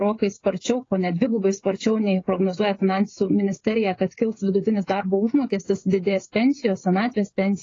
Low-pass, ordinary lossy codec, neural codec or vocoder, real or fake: 7.2 kHz; AAC, 32 kbps; codec, 16 kHz, 16 kbps, FreqCodec, smaller model; fake